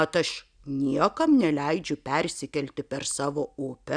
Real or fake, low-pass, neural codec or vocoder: real; 9.9 kHz; none